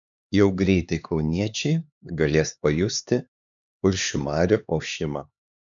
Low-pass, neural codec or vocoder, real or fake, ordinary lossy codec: 7.2 kHz; codec, 16 kHz, 4 kbps, X-Codec, HuBERT features, trained on LibriSpeech; fake; MP3, 96 kbps